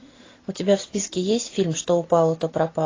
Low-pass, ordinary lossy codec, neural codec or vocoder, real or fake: 7.2 kHz; AAC, 32 kbps; none; real